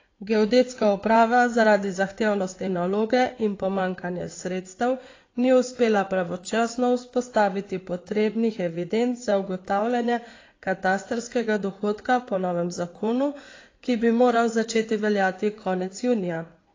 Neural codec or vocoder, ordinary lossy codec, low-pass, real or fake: codec, 16 kHz in and 24 kHz out, 2.2 kbps, FireRedTTS-2 codec; AAC, 32 kbps; 7.2 kHz; fake